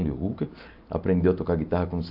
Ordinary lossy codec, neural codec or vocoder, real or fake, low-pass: AAC, 48 kbps; none; real; 5.4 kHz